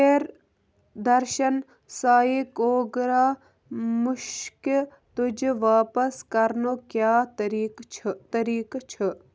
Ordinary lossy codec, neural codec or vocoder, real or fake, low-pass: none; none; real; none